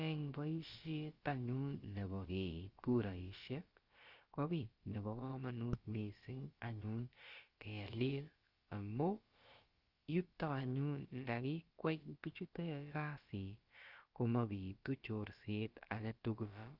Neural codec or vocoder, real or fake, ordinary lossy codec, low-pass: codec, 16 kHz, about 1 kbps, DyCAST, with the encoder's durations; fake; Opus, 24 kbps; 5.4 kHz